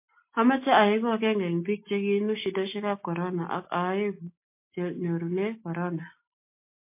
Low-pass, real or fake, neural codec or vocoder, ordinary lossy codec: 3.6 kHz; real; none; MP3, 24 kbps